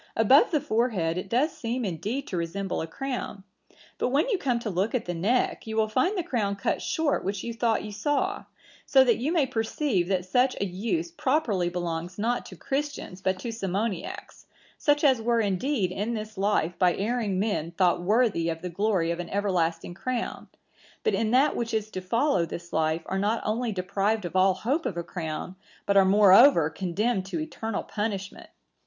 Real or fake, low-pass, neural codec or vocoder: real; 7.2 kHz; none